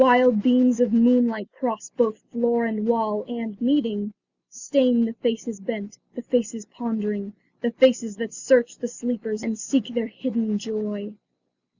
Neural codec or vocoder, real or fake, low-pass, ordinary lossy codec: none; real; 7.2 kHz; Opus, 64 kbps